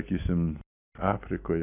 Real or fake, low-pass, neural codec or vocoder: real; 3.6 kHz; none